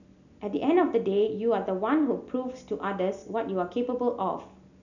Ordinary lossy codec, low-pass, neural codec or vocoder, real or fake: none; 7.2 kHz; none; real